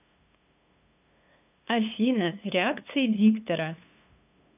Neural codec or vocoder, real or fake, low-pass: codec, 16 kHz, 2 kbps, FunCodec, trained on LibriTTS, 25 frames a second; fake; 3.6 kHz